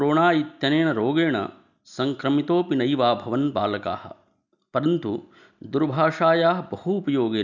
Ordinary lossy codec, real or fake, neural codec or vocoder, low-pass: none; real; none; 7.2 kHz